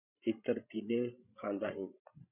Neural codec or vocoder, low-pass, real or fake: codec, 16 kHz, 16 kbps, FreqCodec, larger model; 3.6 kHz; fake